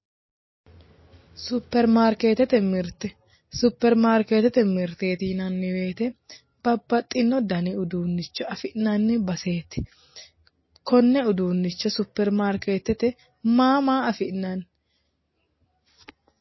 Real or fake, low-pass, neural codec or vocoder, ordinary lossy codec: real; 7.2 kHz; none; MP3, 24 kbps